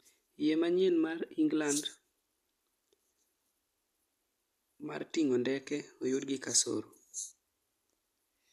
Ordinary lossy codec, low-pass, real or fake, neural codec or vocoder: MP3, 96 kbps; 14.4 kHz; real; none